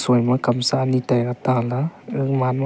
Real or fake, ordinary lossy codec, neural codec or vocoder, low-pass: real; none; none; none